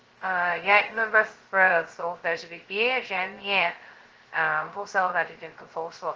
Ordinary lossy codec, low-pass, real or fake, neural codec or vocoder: Opus, 16 kbps; 7.2 kHz; fake; codec, 16 kHz, 0.2 kbps, FocalCodec